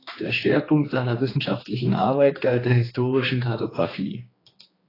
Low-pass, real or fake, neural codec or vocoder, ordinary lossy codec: 5.4 kHz; fake; codec, 16 kHz, 2 kbps, X-Codec, HuBERT features, trained on general audio; AAC, 24 kbps